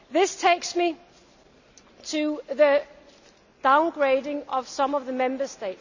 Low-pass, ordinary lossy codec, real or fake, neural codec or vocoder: 7.2 kHz; none; real; none